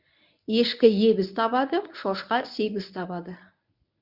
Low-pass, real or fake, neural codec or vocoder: 5.4 kHz; fake; codec, 24 kHz, 0.9 kbps, WavTokenizer, medium speech release version 1